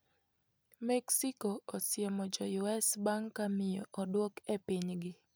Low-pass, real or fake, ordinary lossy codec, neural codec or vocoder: none; real; none; none